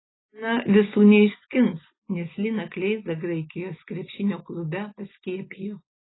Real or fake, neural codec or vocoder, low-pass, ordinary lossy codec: real; none; 7.2 kHz; AAC, 16 kbps